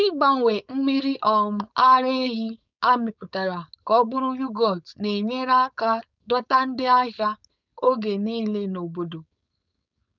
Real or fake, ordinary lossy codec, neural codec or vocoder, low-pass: fake; none; codec, 16 kHz, 4.8 kbps, FACodec; 7.2 kHz